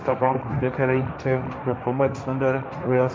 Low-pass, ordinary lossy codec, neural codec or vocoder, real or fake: none; none; codec, 16 kHz, 1.1 kbps, Voila-Tokenizer; fake